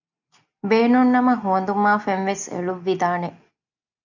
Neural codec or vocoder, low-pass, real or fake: none; 7.2 kHz; real